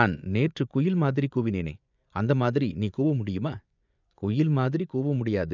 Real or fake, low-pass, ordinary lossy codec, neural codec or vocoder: fake; 7.2 kHz; none; vocoder, 24 kHz, 100 mel bands, Vocos